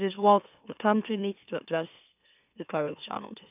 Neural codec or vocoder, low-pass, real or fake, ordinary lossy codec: autoencoder, 44.1 kHz, a latent of 192 numbers a frame, MeloTTS; 3.6 kHz; fake; none